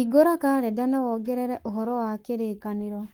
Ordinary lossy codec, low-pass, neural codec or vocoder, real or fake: Opus, 24 kbps; 19.8 kHz; codec, 44.1 kHz, 7.8 kbps, Pupu-Codec; fake